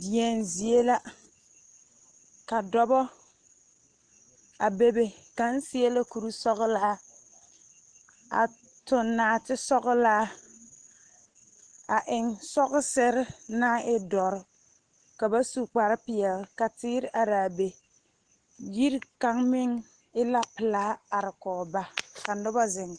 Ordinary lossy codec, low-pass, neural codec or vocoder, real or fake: Opus, 16 kbps; 9.9 kHz; none; real